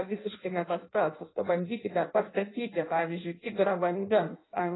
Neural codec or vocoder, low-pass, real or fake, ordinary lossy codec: codec, 16 kHz in and 24 kHz out, 0.6 kbps, FireRedTTS-2 codec; 7.2 kHz; fake; AAC, 16 kbps